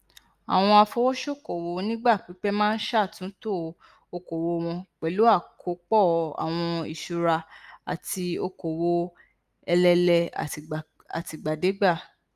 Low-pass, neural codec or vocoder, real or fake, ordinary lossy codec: 14.4 kHz; none; real; Opus, 32 kbps